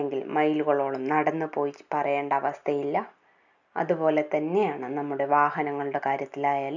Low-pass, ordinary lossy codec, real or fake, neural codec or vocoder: 7.2 kHz; none; real; none